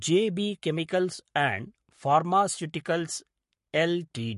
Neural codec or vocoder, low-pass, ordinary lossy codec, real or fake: codec, 44.1 kHz, 7.8 kbps, Pupu-Codec; 14.4 kHz; MP3, 48 kbps; fake